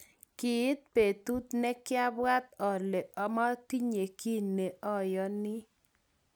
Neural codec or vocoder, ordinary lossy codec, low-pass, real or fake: none; none; none; real